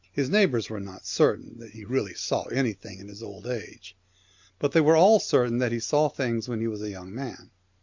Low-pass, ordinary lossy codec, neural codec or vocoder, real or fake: 7.2 kHz; MP3, 64 kbps; none; real